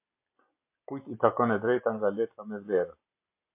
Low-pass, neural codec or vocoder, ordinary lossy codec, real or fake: 3.6 kHz; none; MP3, 24 kbps; real